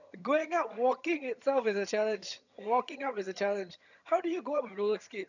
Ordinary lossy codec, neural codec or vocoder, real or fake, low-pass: none; vocoder, 22.05 kHz, 80 mel bands, HiFi-GAN; fake; 7.2 kHz